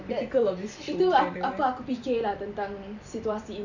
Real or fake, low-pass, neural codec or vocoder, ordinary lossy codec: fake; 7.2 kHz; vocoder, 44.1 kHz, 128 mel bands every 256 samples, BigVGAN v2; none